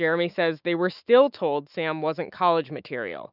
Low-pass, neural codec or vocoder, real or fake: 5.4 kHz; autoencoder, 48 kHz, 128 numbers a frame, DAC-VAE, trained on Japanese speech; fake